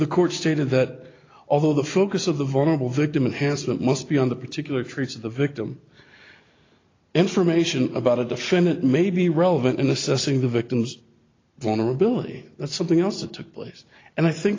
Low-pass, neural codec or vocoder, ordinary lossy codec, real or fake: 7.2 kHz; none; AAC, 32 kbps; real